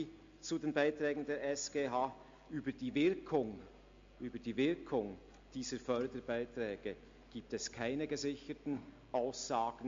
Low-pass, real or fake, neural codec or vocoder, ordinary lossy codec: 7.2 kHz; real; none; MP3, 48 kbps